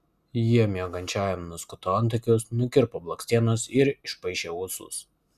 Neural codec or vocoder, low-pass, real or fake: none; 14.4 kHz; real